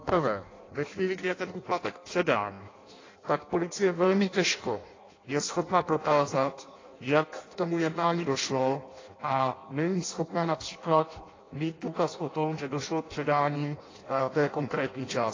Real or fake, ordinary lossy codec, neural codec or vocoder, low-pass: fake; AAC, 32 kbps; codec, 16 kHz in and 24 kHz out, 0.6 kbps, FireRedTTS-2 codec; 7.2 kHz